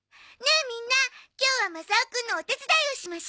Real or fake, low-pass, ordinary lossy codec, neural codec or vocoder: real; none; none; none